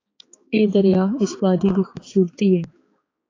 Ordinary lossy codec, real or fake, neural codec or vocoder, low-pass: AAC, 32 kbps; fake; codec, 16 kHz, 4 kbps, X-Codec, HuBERT features, trained on balanced general audio; 7.2 kHz